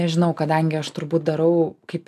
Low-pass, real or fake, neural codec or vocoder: 14.4 kHz; real; none